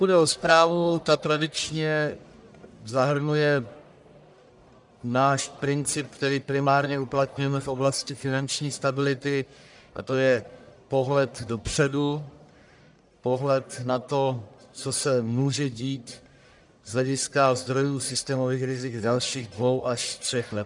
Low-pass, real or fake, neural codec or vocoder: 10.8 kHz; fake; codec, 44.1 kHz, 1.7 kbps, Pupu-Codec